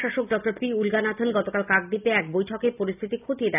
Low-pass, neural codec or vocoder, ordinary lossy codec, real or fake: 3.6 kHz; none; none; real